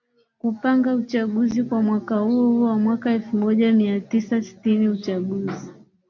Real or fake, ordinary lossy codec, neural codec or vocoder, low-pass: real; MP3, 48 kbps; none; 7.2 kHz